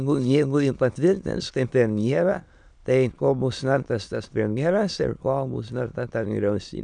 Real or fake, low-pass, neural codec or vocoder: fake; 9.9 kHz; autoencoder, 22.05 kHz, a latent of 192 numbers a frame, VITS, trained on many speakers